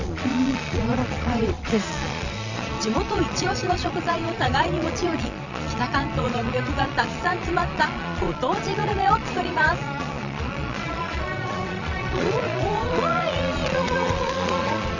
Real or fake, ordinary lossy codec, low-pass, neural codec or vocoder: fake; none; 7.2 kHz; vocoder, 22.05 kHz, 80 mel bands, Vocos